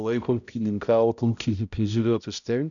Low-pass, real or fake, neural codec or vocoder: 7.2 kHz; fake; codec, 16 kHz, 0.5 kbps, X-Codec, HuBERT features, trained on balanced general audio